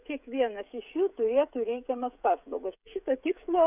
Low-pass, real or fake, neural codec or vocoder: 3.6 kHz; fake; codec, 16 kHz, 16 kbps, FreqCodec, smaller model